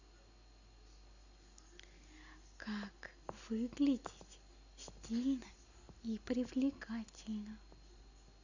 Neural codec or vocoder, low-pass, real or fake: none; 7.2 kHz; real